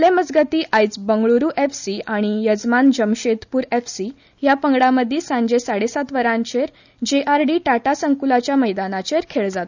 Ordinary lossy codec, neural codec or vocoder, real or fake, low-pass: none; none; real; 7.2 kHz